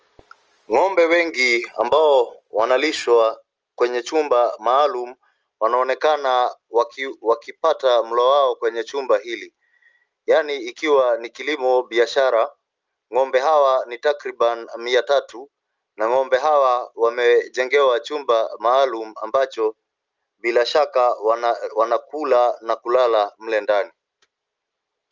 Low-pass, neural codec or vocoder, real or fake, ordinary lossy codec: 7.2 kHz; none; real; Opus, 24 kbps